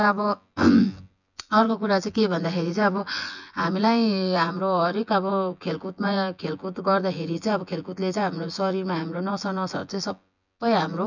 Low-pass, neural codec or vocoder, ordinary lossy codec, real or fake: 7.2 kHz; vocoder, 24 kHz, 100 mel bands, Vocos; none; fake